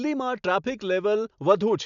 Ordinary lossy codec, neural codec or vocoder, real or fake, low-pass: none; none; real; 7.2 kHz